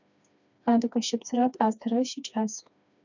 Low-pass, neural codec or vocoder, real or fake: 7.2 kHz; codec, 16 kHz, 2 kbps, FreqCodec, smaller model; fake